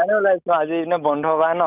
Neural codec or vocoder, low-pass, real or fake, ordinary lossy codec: none; 3.6 kHz; real; none